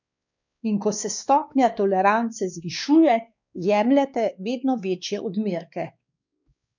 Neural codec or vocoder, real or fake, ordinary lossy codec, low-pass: codec, 16 kHz, 2 kbps, X-Codec, WavLM features, trained on Multilingual LibriSpeech; fake; none; 7.2 kHz